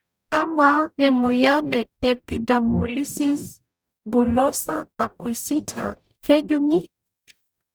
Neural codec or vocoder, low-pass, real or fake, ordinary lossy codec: codec, 44.1 kHz, 0.9 kbps, DAC; none; fake; none